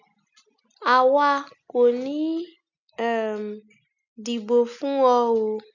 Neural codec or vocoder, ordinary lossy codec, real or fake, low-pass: none; none; real; 7.2 kHz